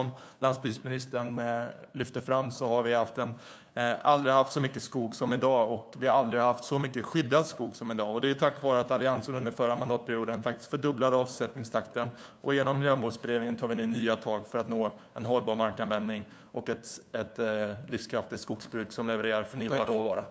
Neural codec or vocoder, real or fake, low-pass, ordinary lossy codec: codec, 16 kHz, 2 kbps, FunCodec, trained on LibriTTS, 25 frames a second; fake; none; none